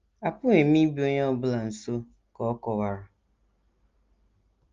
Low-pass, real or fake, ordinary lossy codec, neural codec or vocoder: 7.2 kHz; real; Opus, 32 kbps; none